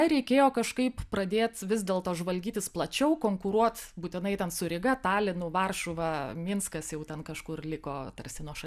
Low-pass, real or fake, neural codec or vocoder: 14.4 kHz; real; none